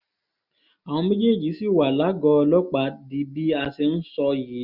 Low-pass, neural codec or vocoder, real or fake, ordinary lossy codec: 5.4 kHz; none; real; none